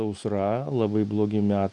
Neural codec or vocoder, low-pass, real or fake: none; 10.8 kHz; real